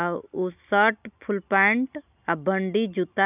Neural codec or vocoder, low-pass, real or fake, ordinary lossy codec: none; 3.6 kHz; real; none